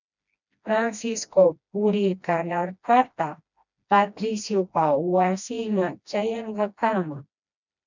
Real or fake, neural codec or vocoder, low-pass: fake; codec, 16 kHz, 1 kbps, FreqCodec, smaller model; 7.2 kHz